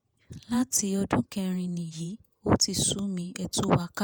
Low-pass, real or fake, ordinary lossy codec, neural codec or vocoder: none; fake; none; vocoder, 48 kHz, 128 mel bands, Vocos